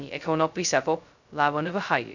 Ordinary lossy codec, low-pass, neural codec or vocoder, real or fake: none; 7.2 kHz; codec, 16 kHz, 0.2 kbps, FocalCodec; fake